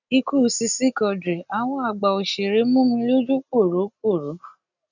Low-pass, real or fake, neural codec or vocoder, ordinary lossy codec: 7.2 kHz; real; none; none